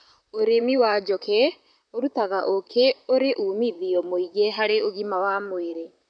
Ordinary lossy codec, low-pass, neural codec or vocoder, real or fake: none; 9.9 kHz; vocoder, 44.1 kHz, 128 mel bands, Pupu-Vocoder; fake